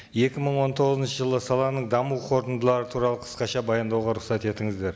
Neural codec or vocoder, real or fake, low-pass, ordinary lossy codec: none; real; none; none